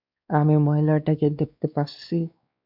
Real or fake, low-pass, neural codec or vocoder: fake; 5.4 kHz; codec, 16 kHz, 2 kbps, X-Codec, WavLM features, trained on Multilingual LibriSpeech